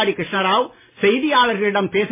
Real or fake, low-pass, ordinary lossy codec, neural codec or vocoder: real; 3.6 kHz; MP3, 16 kbps; none